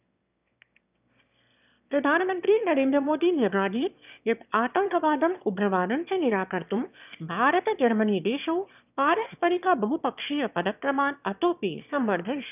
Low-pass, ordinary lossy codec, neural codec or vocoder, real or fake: 3.6 kHz; none; autoencoder, 22.05 kHz, a latent of 192 numbers a frame, VITS, trained on one speaker; fake